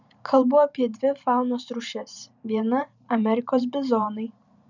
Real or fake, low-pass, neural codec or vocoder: fake; 7.2 kHz; vocoder, 44.1 kHz, 128 mel bands every 512 samples, BigVGAN v2